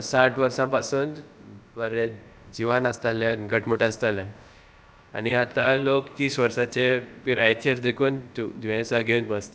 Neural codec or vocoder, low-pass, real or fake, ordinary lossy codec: codec, 16 kHz, about 1 kbps, DyCAST, with the encoder's durations; none; fake; none